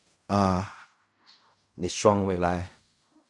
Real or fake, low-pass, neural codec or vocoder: fake; 10.8 kHz; codec, 16 kHz in and 24 kHz out, 0.4 kbps, LongCat-Audio-Codec, fine tuned four codebook decoder